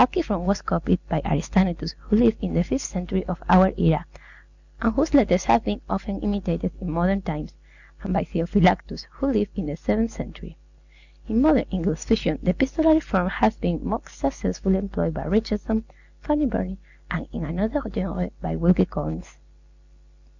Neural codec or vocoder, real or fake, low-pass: none; real; 7.2 kHz